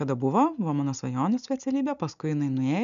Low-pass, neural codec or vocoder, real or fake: 7.2 kHz; none; real